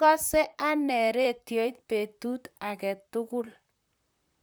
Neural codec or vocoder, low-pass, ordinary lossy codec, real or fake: vocoder, 44.1 kHz, 128 mel bands, Pupu-Vocoder; none; none; fake